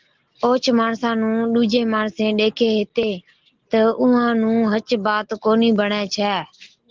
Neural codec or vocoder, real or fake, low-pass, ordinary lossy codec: none; real; 7.2 kHz; Opus, 16 kbps